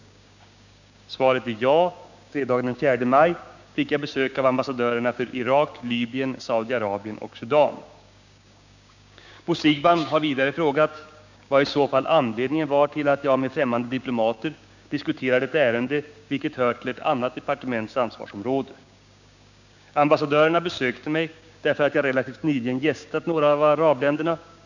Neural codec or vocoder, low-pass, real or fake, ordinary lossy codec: codec, 16 kHz, 6 kbps, DAC; 7.2 kHz; fake; none